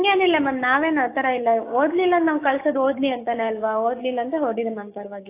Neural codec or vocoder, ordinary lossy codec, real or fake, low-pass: codec, 16 kHz, 6 kbps, DAC; AAC, 24 kbps; fake; 3.6 kHz